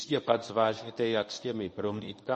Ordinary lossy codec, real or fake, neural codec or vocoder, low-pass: MP3, 32 kbps; fake; codec, 24 kHz, 0.9 kbps, WavTokenizer, medium speech release version 1; 10.8 kHz